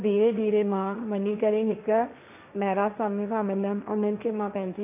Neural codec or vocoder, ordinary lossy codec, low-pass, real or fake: codec, 16 kHz, 1.1 kbps, Voila-Tokenizer; AAC, 32 kbps; 3.6 kHz; fake